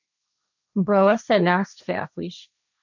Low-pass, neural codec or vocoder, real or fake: 7.2 kHz; codec, 16 kHz, 1.1 kbps, Voila-Tokenizer; fake